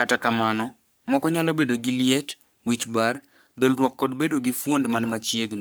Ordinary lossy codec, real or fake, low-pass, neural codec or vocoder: none; fake; none; codec, 44.1 kHz, 3.4 kbps, Pupu-Codec